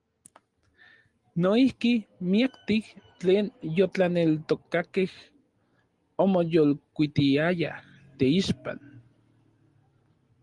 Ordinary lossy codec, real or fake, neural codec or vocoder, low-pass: Opus, 24 kbps; real; none; 10.8 kHz